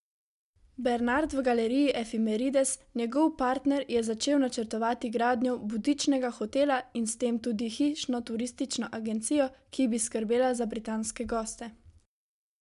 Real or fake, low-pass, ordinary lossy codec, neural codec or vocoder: real; 10.8 kHz; none; none